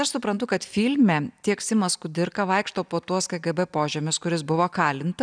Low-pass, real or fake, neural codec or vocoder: 9.9 kHz; real; none